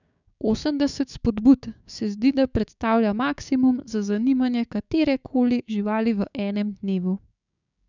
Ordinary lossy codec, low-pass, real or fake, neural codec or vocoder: none; 7.2 kHz; fake; codec, 16 kHz, 6 kbps, DAC